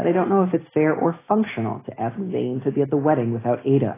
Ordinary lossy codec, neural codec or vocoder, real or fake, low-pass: AAC, 16 kbps; none; real; 3.6 kHz